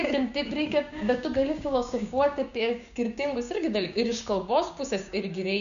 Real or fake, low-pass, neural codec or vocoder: fake; 7.2 kHz; codec, 16 kHz, 6 kbps, DAC